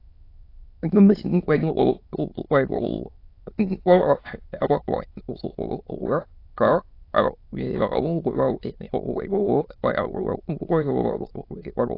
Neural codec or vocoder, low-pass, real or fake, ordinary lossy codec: autoencoder, 22.05 kHz, a latent of 192 numbers a frame, VITS, trained on many speakers; 5.4 kHz; fake; AAC, 32 kbps